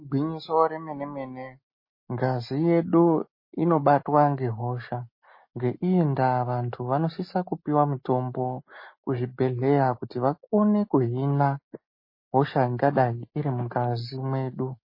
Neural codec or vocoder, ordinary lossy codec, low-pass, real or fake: none; MP3, 24 kbps; 5.4 kHz; real